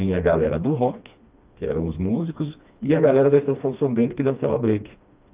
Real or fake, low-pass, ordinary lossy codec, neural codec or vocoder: fake; 3.6 kHz; Opus, 24 kbps; codec, 16 kHz, 2 kbps, FreqCodec, smaller model